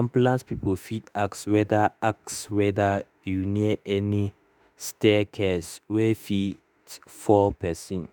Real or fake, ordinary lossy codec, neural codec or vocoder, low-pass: fake; none; autoencoder, 48 kHz, 32 numbers a frame, DAC-VAE, trained on Japanese speech; none